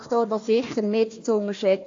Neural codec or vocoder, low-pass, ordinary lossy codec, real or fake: codec, 16 kHz, 1 kbps, FunCodec, trained on Chinese and English, 50 frames a second; 7.2 kHz; AAC, 48 kbps; fake